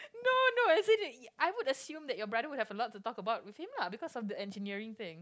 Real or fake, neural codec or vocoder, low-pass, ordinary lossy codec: real; none; none; none